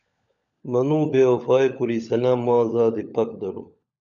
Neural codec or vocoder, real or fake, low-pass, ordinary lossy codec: codec, 16 kHz, 16 kbps, FunCodec, trained on LibriTTS, 50 frames a second; fake; 7.2 kHz; MP3, 96 kbps